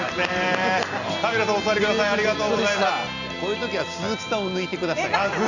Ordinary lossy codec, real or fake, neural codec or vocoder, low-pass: none; real; none; 7.2 kHz